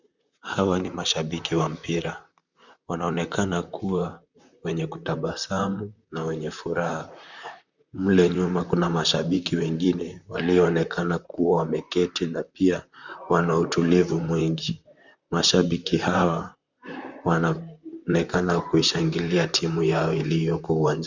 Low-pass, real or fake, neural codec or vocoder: 7.2 kHz; fake; vocoder, 44.1 kHz, 128 mel bands, Pupu-Vocoder